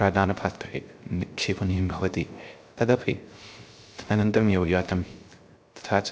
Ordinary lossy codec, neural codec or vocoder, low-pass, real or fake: none; codec, 16 kHz, 0.3 kbps, FocalCodec; none; fake